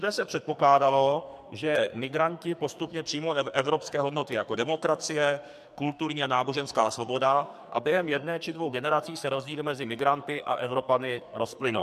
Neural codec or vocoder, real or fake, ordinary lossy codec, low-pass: codec, 44.1 kHz, 2.6 kbps, SNAC; fake; MP3, 96 kbps; 14.4 kHz